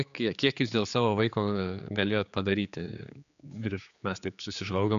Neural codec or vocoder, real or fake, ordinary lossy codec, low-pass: codec, 16 kHz, 4 kbps, X-Codec, HuBERT features, trained on general audio; fake; AAC, 96 kbps; 7.2 kHz